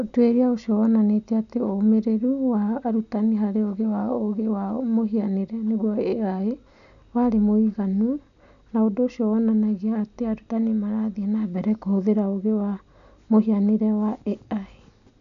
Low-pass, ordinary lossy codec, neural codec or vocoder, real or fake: 7.2 kHz; none; none; real